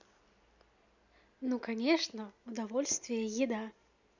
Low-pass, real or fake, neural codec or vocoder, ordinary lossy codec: 7.2 kHz; real; none; none